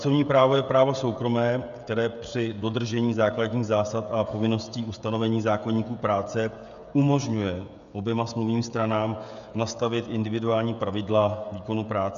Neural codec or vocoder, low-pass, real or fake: codec, 16 kHz, 16 kbps, FreqCodec, smaller model; 7.2 kHz; fake